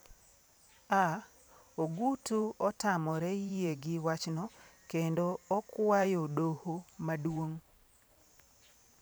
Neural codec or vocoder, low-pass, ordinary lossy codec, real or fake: vocoder, 44.1 kHz, 128 mel bands every 512 samples, BigVGAN v2; none; none; fake